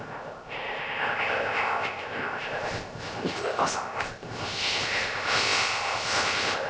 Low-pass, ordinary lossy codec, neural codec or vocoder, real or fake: none; none; codec, 16 kHz, 0.3 kbps, FocalCodec; fake